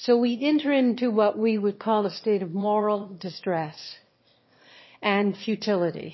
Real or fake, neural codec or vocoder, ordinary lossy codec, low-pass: fake; autoencoder, 22.05 kHz, a latent of 192 numbers a frame, VITS, trained on one speaker; MP3, 24 kbps; 7.2 kHz